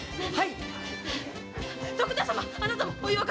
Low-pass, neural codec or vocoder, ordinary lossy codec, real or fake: none; none; none; real